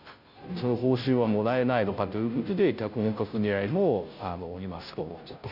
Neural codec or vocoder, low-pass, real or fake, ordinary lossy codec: codec, 16 kHz, 0.5 kbps, FunCodec, trained on Chinese and English, 25 frames a second; 5.4 kHz; fake; none